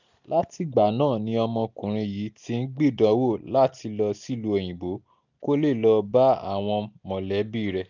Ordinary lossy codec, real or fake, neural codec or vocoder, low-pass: none; real; none; 7.2 kHz